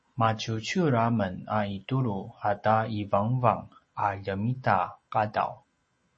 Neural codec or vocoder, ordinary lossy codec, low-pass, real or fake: none; MP3, 32 kbps; 10.8 kHz; real